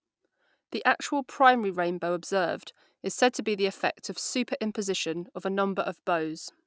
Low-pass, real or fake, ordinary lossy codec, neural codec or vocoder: none; real; none; none